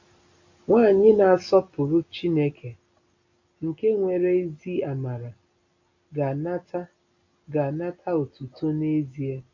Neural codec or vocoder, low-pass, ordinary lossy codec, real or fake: none; 7.2 kHz; AAC, 48 kbps; real